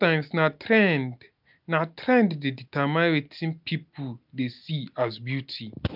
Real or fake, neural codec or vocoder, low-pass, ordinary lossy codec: real; none; 5.4 kHz; none